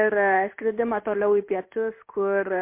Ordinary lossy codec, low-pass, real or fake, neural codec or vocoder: MP3, 24 kbps; 3.6 kHz; fake; codec, 16 kHz in and 24 kHz out, 1 kbps, XY-Tokenizer